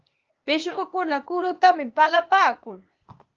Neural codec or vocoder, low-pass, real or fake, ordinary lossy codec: codec, 16 kHz, 0.8 kbps, ZipCodec; 7.2 kHz; fake; Opus, 24 kbps